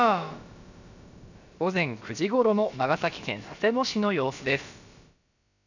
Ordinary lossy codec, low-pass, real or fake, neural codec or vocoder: none; 7.2 kHz; fake; codec, 16 kHz, about 1 kbps, DyCAST, with the encoder's durations